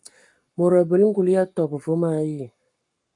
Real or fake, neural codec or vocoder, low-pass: fake; codec, 44.1 kHz, 7.8 kbps, Pupu-Codec; 10.8 kHz